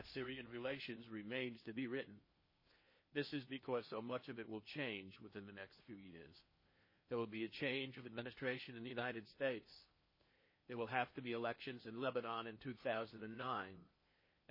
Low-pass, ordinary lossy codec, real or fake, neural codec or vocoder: 5.4 kHz; MP3, 24 kbps; fake; codec, 16 kHz in and 24 kHz out, 0.8 kbps, FocalCodec, streaming, 65536 codes